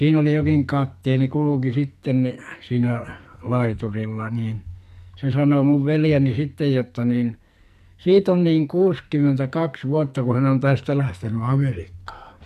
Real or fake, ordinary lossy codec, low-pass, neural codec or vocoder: fake; MP3, 96 kbps; 14.4 kHz; codec, 44.1 kHz, 2.6 kbps, SNAC